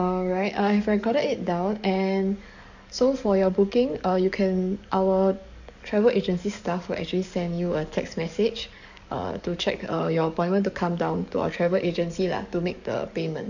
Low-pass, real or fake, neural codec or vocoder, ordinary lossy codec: 7.2 kHz; fake; codec, 44.1 kHz, 7.8 kbps, DAC; none